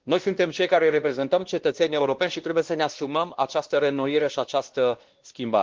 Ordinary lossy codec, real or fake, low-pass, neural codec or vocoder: Opus, 16 kbps; fake; 7.2 kHz; codec, 16 kHz, 1 kbps, X-Codec, WavLM features, trained on Multilingual LibriSpeech